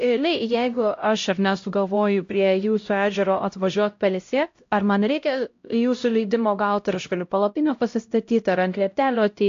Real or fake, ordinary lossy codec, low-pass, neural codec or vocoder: fake; AAC, 48 kbps; 7.2 kHz; codec, 16 kHz, 0.5 kbps, X-Codec, HuBERT features, trained on LibriSpeech